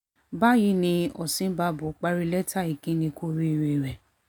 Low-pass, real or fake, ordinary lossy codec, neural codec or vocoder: 19.8 kHz; real; none; none